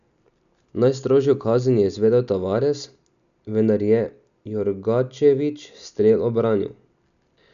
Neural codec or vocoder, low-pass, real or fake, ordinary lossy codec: none; 7.2 kHz; real; none